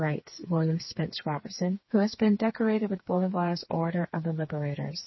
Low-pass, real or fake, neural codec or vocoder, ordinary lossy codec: 7.2 kHz; fake; codec, 16 kHz, 4 kbps, FreqCodec, smaller model; MP3, 24 kbps